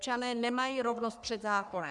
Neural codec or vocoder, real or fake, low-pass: codec, 44.1 kHz, 3.4 kbps, Pupu-Codec; fake; 10.8 kHz